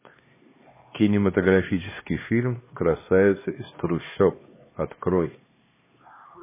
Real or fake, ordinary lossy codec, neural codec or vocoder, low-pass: fake; MP3, 16 kbps; codec, 16 kHz, 2 kbps, X-Codec, HuBERT features, trained on LibriSpeech; 3.6 kHz